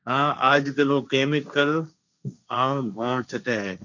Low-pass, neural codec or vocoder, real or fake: 7.2 kHz; codec, 16 kHz, 1.1 kbps, Voila-Tokenizer; fake